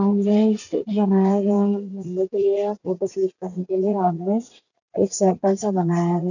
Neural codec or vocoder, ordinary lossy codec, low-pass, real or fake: vocoder, 44.1 kHz, 80 mel bands, Vocos; none; 7.2 kHz; fake